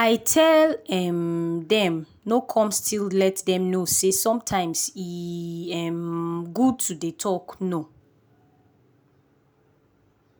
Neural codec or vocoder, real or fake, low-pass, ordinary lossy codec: none; real; none; none